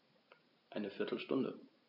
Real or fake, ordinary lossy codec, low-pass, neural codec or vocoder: real; none; 5.4 kHz; none